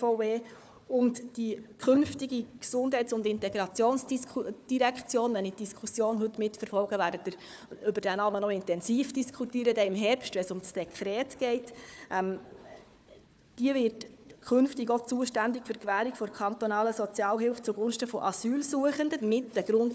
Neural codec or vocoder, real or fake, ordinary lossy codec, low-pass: codec, 16 kHz, 4 kbps, FunCodec, trained on Chinese and English, 50 frames a second; fake; none; none